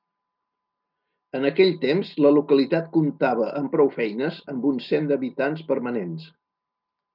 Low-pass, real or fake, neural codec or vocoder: 5.4 kHz; real; none